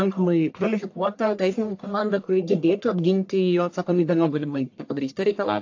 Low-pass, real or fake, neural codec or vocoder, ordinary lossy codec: 7.2 kHz; fake; codec, 44.1 kHz, 1.7 kbps, Pupu-Codec; AAC, 48 kbps